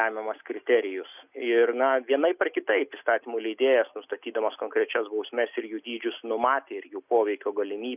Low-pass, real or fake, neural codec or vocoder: 3.6 kHz; real; none